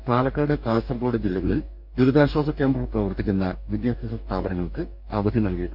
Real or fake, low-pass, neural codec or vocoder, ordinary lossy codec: fake; 5.4 kHz; codec, 44.1 kHz, 2.6 kbps, DAC; none